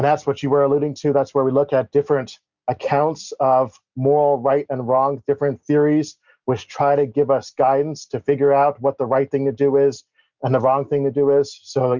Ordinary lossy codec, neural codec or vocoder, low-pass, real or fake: Opus, 64 kbps; none; 7.2 kHz; real